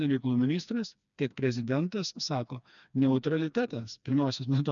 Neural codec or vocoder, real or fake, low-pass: codec, 16 kHz, 2 kbps, FreqCodec, smaller model; fake; 7.2 kHz